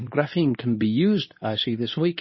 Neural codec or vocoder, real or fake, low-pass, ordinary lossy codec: codec, 24 kHz, 0.9 kbps, WavTokenizer, medium speech release version 2; fake; 7.2 kHz; MP3, 24 kbps